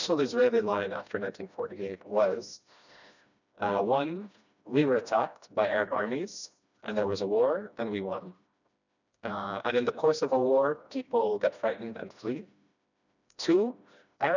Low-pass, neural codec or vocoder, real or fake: 7.2 kHz; codec, 16 kHz, 1 kbps, FreqCodec, smaller model; fake